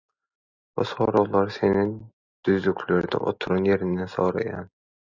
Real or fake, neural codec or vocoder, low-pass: real; none; 7.2 kHz